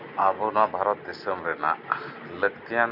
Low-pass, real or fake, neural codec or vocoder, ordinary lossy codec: 5.4 kHz; real; none; none